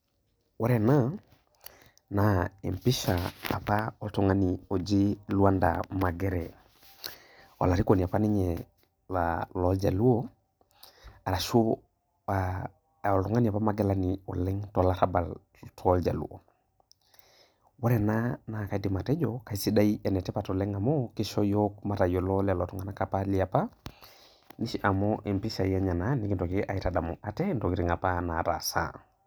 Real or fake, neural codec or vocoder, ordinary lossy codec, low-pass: real; none; none; none